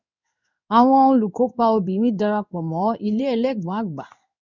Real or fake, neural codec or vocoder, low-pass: fake; codec, 16 kHz in and 24 kHz out, 1 kbps, XY-Tokenizer; 7.2 kHz